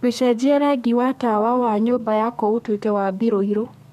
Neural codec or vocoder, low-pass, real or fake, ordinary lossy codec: codec, 32 kHz, 1.9 kbps, SNAC; 14.4 kHz; fake; none